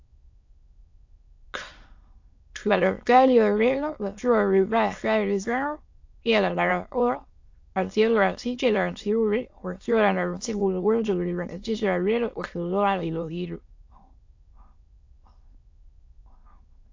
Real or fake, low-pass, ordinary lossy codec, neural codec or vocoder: fake; 7.2 kHz; AAC, 48 kbps; autoencoder, 22.05 kHz, a latent of 192 numbers a frame, VITS, trained on many speakers